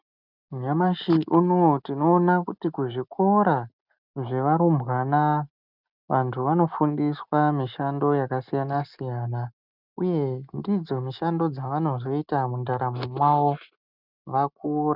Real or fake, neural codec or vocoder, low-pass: real; none; 5.4 kHz